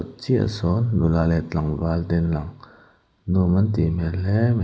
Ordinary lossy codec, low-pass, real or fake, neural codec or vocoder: none; none; real; none